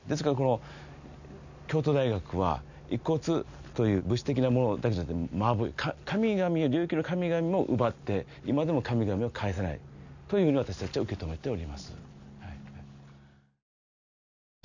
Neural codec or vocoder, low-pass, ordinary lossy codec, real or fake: none; 7.2 kHz; none; real